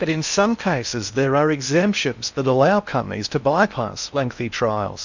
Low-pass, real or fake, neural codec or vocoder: 7.2 kHz; fake; codec, 16 kHz in and 24 kHz out, 0.6 kbps, FocalCodec, streaming, 2048 codes